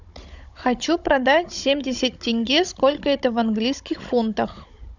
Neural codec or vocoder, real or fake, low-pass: codec, 16 kHz, 16 kbps, FunCodec, trained on Chinese and English, 50 frames a second; fake; 7.2 kHz